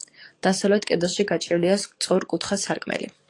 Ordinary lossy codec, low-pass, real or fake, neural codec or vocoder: AAC, 48 kbps; 10.8 kHz; fake; codec, 44.1 kHz, 7.8 kbps, DAC